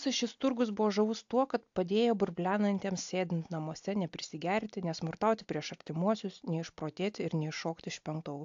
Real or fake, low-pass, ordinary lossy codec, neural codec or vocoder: real; 7.2 kHz; AAC, 64 kbps; none